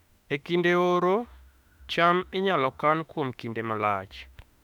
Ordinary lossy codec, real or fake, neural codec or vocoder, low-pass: none; fake; autoencoder, 48 kHz, 32 numbers a frame, DAC-VAE, trained on Japanese speech; 19.8 kHz